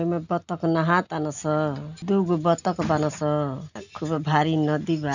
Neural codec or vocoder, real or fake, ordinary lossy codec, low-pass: none; real; none; 7.2 kHz